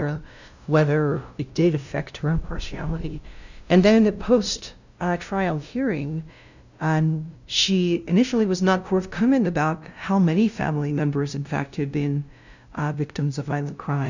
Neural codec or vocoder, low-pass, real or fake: codec, 16 kHz, 0.5 kbps, FunCodec, trained on LibriTTS, 25 frames a second; 7.2 kHz; fake